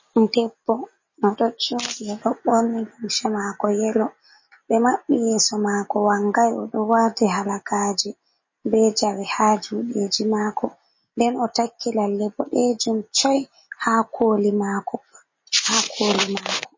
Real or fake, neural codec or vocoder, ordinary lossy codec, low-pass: real; none; MP3, 32 kbps; 7.2 kHz